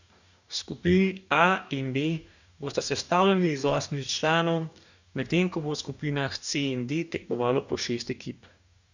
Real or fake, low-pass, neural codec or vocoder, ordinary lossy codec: fake; 7.2 kHz; codec, 44.1 kHz, 2.6 kbps, DAC; none